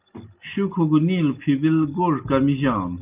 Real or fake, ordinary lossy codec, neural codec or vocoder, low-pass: real; Opus, 24 kbps; none; 3.6 kHz